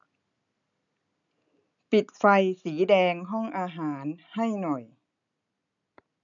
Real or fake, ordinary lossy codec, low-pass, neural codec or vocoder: real; none; 7.2 kHz; none